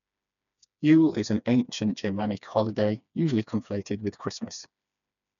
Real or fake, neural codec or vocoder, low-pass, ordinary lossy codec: fake; codec, 16 kHz, 2 kbps, FreqCodec, smaller model; 7.2 kHz; none